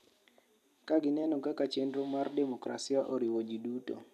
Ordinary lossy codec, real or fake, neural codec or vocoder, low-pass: none; fake; vocoder, 44.1 kHz, 128 mel bands every 256 samples, BigVGAN v2; 14.4 kHz